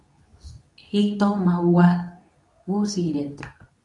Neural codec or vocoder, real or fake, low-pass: codec, 24 kHz, 0.9 kbps, WavTokenizer, medium speech release version 2; fake; 10.8 kHz